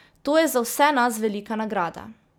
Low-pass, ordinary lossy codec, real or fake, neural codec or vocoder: none; none; real; none